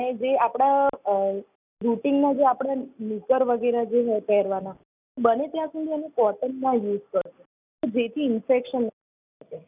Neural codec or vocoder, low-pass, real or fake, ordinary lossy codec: none; 3.6 kHz; real; none